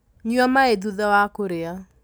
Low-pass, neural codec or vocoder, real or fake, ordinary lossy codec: none; none; real; none